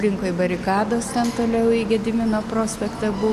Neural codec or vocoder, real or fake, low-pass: none; real; 14.4 kHz